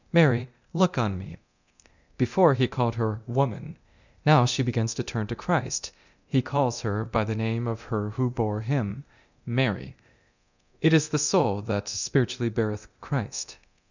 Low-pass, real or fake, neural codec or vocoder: 7.2 kHz; fake; codec, 24 kHz, 0.9 kbps, DualCodec